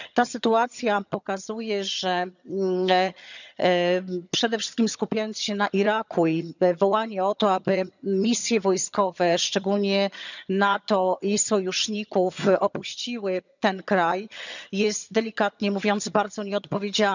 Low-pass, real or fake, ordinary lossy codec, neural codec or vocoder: 7.2 kHz; fake; none; vocoder, 22.05 kHz, 80 mel bands, HiFi-GAN